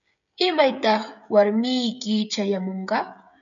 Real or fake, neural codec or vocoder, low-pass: fake; codec, 16 kHz, 16 kbps, FreqCodec, smaller model; 7.2 kHz